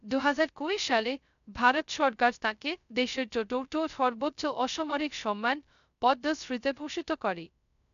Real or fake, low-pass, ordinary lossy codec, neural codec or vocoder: fake; 7.2 kHz; none; codec, 16 kHz, 0.2 kbps, FocalCodec